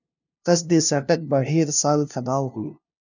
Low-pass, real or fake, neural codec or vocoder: 7.2 kHz; fake; codec, 16 kHz, 0.5 kbps, FunCodec, trained on LibriTTS, 25 frames a second